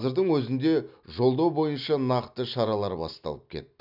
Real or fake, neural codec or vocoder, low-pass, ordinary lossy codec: real; none; 5.4 kHz; none